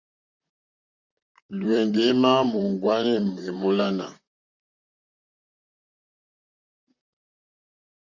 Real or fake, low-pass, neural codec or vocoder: fake; 7.2 kHz; vocoder, 44.1 kHz, 128 mel bands, Pupu-Vocoder